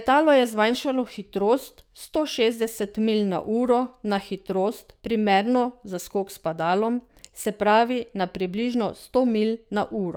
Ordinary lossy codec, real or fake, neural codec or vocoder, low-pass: none; fake; codec, 44.1 kHz, 7.8 kbps, DAC; none